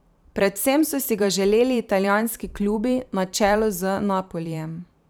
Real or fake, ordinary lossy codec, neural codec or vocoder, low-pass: fake; none; vocoder, 44.1 kHz, 128 mel bands every 512 samples, BigVGAN v2; none